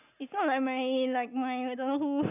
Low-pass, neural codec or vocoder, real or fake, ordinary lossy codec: 3.6 kHz; none; real; none